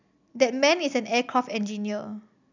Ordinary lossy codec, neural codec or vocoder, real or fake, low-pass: none; none; real; 7.2 kHz